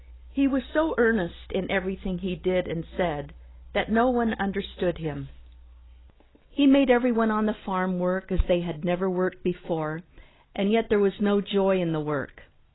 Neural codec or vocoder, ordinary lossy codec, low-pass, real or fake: none; AAC, 16 kbps; 7.2 kHz; real